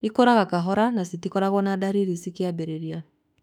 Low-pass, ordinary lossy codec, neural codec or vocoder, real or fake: 19.8 kHz; none; autoencoder, 48 kHz, 32 numbers a frame, DAC-VAE, trained on Japanese speech; fake